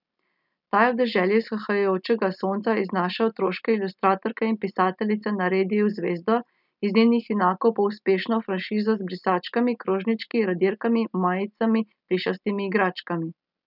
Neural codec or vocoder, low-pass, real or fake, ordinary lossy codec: none; 5.4 kHz; real; none